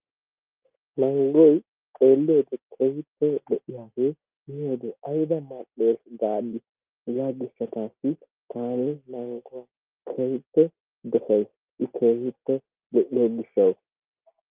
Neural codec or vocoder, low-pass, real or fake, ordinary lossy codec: none; 3.6 kHz; real; Opus, 24 kbps